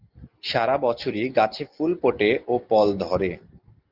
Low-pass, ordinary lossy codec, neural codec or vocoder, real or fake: 5.4 kHz; Opus, 32 kbps; none; real